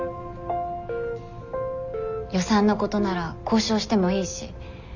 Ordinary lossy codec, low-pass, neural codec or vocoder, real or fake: none; 7.2 kHz; none; real